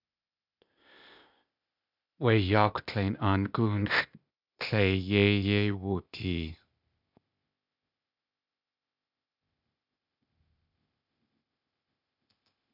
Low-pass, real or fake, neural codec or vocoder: 5.4 kHz; fake; codec, 16 kHz, 0.8 kbps, ZipCodec